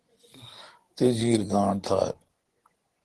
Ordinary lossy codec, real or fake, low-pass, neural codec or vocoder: Opus, 16 kbps; fake; 10.8 kHz; vocoder, 24 kHz, 100 mel bands, Vocos